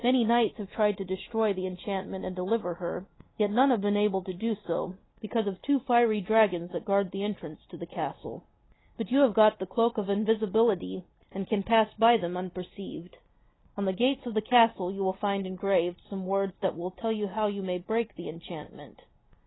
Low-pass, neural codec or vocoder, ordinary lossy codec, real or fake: 7.2 kHz; none; AAC, 16 kbps; real